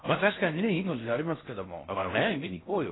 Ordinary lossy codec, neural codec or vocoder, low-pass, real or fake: AAC, 16 kbps; codec, 16 kHz in and 24 kHz out, 0.6 kbps, FocalCodec, streaming, 4096 codes; 7.2 kHz; fake